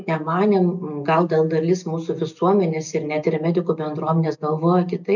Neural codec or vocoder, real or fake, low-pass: none; real; 7.2 kHz